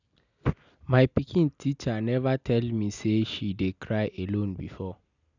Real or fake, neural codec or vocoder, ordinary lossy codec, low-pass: real; none; none; 7.2 kHz